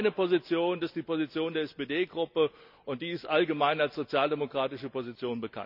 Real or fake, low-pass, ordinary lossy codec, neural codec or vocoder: real; 5.4 kHz; none; none